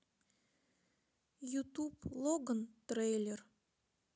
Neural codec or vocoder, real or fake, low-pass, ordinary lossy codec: none; real; none; none